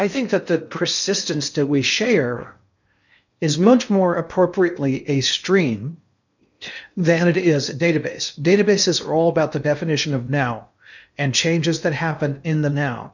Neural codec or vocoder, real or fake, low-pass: codec, 16 kHz in and 24 kHz out, 0.6 kbps, FocalCodec, streaming, 4096 codes; fake; 7.2 kHz